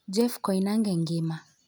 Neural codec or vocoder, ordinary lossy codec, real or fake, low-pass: none; none; real; none